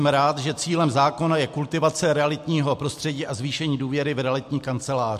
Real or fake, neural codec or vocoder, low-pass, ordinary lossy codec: real; none; 14.4 kHz; MP3, 64 kbps